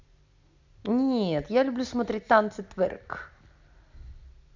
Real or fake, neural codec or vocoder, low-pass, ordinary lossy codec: real; none; 7.2 kHz; MP3, 64 kbps